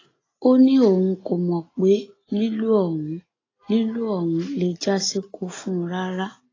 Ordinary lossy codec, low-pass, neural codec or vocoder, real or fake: AAC, 32 kbps; 7.2 kHz; none; real